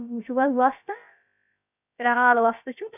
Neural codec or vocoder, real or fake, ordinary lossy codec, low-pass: codec, 16 kHz, about 1 kbps, DyCAST, with the encoder's durations; fake; none; 3.6 kHz